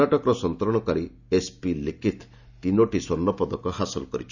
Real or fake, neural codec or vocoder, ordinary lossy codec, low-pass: real; none; none; 7.2 kHz